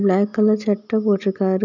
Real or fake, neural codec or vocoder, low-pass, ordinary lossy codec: real; none; 7.2 kHz; none